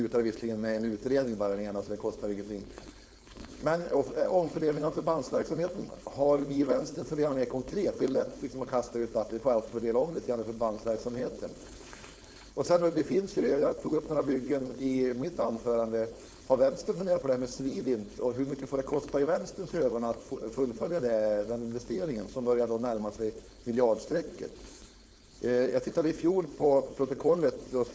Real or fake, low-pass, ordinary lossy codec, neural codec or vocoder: fake; none; none; codec, 16 kHz, 4.8 kbps, FACodec